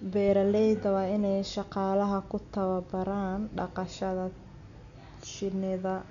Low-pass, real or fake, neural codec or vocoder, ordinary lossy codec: 7.2 kHz; real; none; none